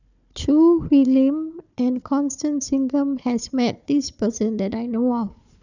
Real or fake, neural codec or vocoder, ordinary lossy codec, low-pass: fake; codec, 16 kHz, 4 kbps, FunCodec, trained on Chinese and English, 50 frames a second; none; 7.2 kHz